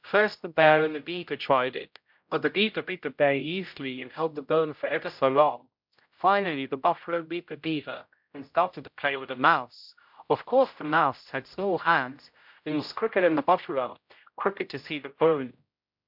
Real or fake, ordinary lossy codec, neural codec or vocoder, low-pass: fake; MP3, 48 kbps; codec, 16 kHz, 0.5 kbps, X-Codec, HuBERT features, trained on general audio; 5.4 kHz